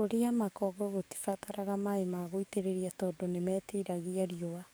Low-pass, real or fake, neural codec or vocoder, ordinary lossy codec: none; fake; codec, 44.1 kHz, 7.8 kbps, DAC; none